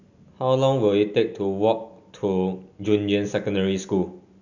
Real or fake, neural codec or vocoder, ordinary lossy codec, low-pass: real; none; none; 7.2 kHz